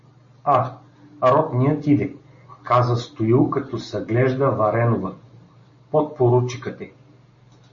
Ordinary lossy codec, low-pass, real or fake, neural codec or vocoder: MP3, 32 kbps; 7.2 kHz; real; none